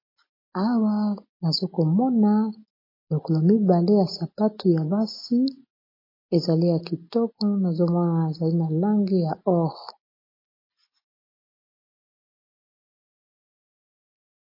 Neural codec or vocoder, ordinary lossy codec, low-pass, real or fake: none; MP3, 24 kbps; 5.4 kHz; real